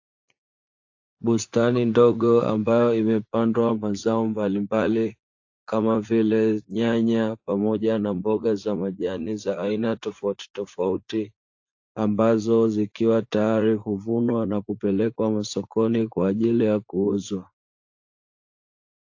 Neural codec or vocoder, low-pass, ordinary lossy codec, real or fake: vocoder, 44.1 kHz, 80 mel bands, Vocos; 7.2 kHz; AAC, 48 kbps; fake